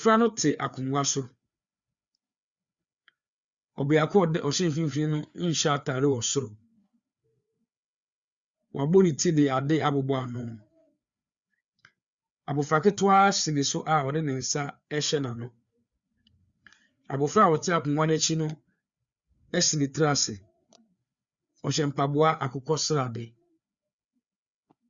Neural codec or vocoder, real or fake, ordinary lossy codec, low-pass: codec, 16 kHz, 4 kbps, FreqCodec, larger model; fake; Opus, 64 kbps; 7.2 kHz